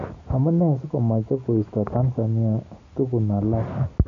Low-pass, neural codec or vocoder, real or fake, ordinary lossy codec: 7.2 kHz; none; real; AAC, 64 kbps